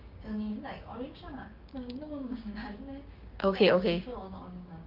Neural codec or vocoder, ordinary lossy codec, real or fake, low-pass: codec, 16 kHz, 6 kbps, DAC; Opus, 32 kbps; fake; 5.4 kHz